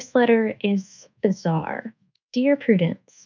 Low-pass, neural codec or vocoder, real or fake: 7.2 kHz; codec, 24 kHz, 1.2 kbps, DualCodec; fake